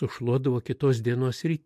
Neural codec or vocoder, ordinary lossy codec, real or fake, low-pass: none; MP3, 64 kbps; real; 14.4 kHz